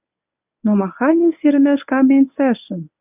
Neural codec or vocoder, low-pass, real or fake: codec, 24 kHz, 0.9 kbps, WavTokenizer, medium speech release version 1; 3.6 kHz; fake